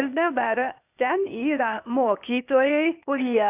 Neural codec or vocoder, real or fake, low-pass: codec, 16 kHz, 0.8 kbps, ZipCodec; fake; 3.6 kHz